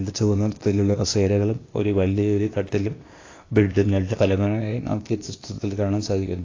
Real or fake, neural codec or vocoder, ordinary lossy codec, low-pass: fake; codec, 16 kHz, 0.8 kbps, ZipCodec; AAC, 32 kbps; 7.2 kHz